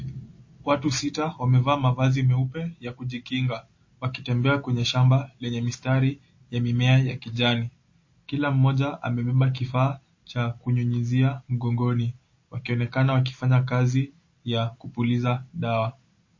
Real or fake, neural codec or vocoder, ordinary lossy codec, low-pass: real; none; MP3, 32 kbps; 7.2 kHz